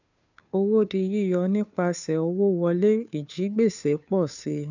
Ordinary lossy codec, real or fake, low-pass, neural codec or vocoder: none; fake; 7.2 kHz; codec, 16 kHz, 2 kbps, FunCodec, trained on Chinese and English, 25 frames a second